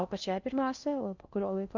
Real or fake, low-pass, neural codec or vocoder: fake; 7.2 kHz; codec, 16 kHz in and 24 kHz out, 0.6 kbps, FocalCodec, streaming, 2048 codes